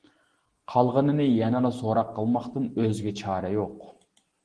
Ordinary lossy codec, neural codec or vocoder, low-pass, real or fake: Opus, 16 kbps; none; 9.9 kHz; real